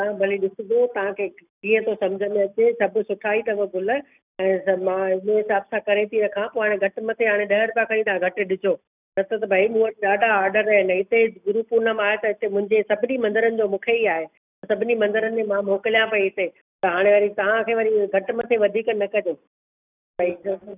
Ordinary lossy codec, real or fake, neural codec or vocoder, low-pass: none; real; none; 3.6 kHz